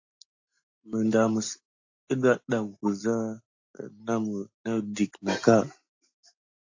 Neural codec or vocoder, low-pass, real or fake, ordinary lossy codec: none; 7.2 kHz; real; AAC, 48 kbps